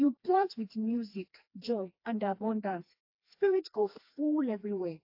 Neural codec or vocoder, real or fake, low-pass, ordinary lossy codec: codec, 16 kHz, 2 kbps, FreqCodec, smaller model; fake; 5.4 kHz; AAC, 32 kbps